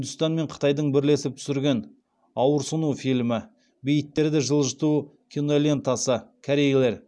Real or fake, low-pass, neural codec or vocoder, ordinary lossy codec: real; none; none; none